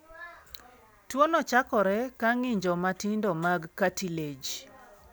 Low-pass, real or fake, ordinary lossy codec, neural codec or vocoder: none; real; none; none